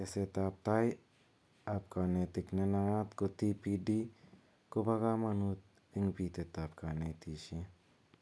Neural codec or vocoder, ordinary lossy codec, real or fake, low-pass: none; none; real; none